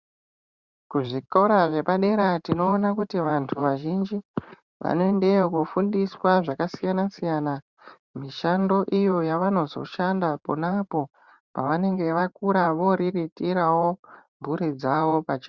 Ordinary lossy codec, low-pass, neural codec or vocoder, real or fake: Opus, 64 kbps; 7.2 kHz; vocoder, 44.1 kHz, 128 mel bands every 512 samples, BigVGAN v2; fake